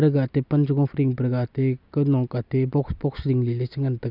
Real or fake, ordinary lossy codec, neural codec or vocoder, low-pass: real; none; none; 5.4 kHz